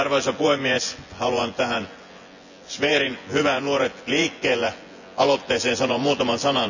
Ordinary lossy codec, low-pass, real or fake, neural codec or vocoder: none; 7.2 kHz; fake; vocoder, 24 kHz, 100 mel bands, Vocos